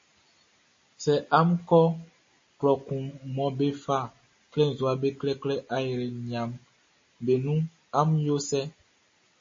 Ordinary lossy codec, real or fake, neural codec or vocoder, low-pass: MP3, 32 kbps; real; none; 7.2 kHz